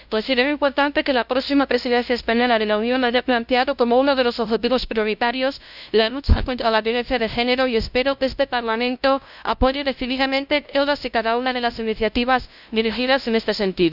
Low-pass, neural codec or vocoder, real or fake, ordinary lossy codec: 5.4 kHz; codec, 16 kHz, 0.5 kbps, FunCodec, trained on LibriTTS, 25 frames a second; fake; none